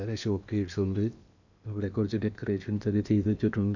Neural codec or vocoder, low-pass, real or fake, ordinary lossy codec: codec, 16 kHz in and 24 kHz out, 0.8 kbps, FocalCodec, streaming, 65536 codes; 7.2 kHz; fake; none